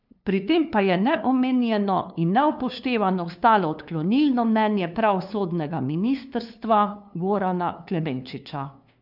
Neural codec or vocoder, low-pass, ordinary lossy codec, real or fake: codec, 16 kHz, 2 kbps, FunCodec, trained on LibriTTS, 25 frames a second; 5.4 kHz; none; fake